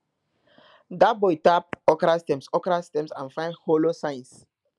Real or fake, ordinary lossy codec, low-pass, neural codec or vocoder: real; none; none; none